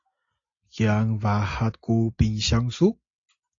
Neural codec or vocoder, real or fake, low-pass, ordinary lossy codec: none; real; 7.2 kHz; AAC, 48 kbps